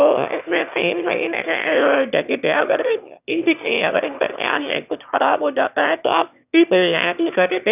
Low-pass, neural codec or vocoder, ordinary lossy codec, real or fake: 3.6 kHz; autoencoder, 22.05 kHz, a latent of 192 numbers a frame, VITS, trained on one speaker; none; fake